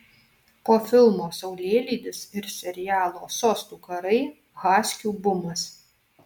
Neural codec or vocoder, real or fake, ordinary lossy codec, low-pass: none; real; MP3, 96 kbps; 19.8 kHz